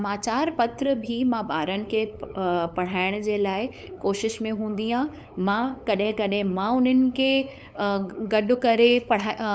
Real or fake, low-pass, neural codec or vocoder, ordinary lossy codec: fake; none; codec, 16 kHz, 8 kbps, FunCodec, trained on LibriTTS, 25 frames a second; none